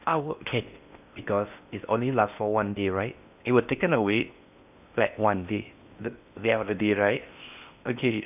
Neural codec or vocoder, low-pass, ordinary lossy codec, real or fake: codec, 16 kHz in and 24 kHz out, 0.6 kbps, FocalCodec, streaming, 4096 codes; 3.6 kHz; none; fake